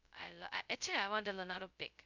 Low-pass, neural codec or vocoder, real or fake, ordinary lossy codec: 7.2 kHz; codec, 16 kHz, 0.2 kbps, FocalCodec; fake; none